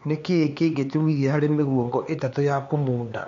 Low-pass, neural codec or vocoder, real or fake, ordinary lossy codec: 7.2 kHz; codec, 16 kHz, 4 kbps, X-Codec, HuBERT features, trained on LibriSpeech; fake; MP3, 64 kbps